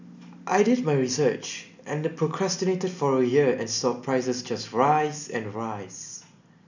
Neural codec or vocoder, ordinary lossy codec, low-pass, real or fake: none; none; 7.2 kHz; real